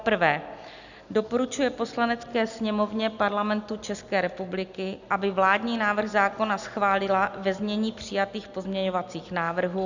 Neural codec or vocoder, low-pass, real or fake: none; 7.2 kHz; real